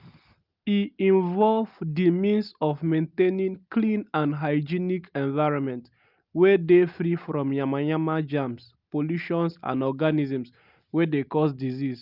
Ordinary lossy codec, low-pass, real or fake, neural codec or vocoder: Opus, 24 kbps; 5.4 kHz; real; none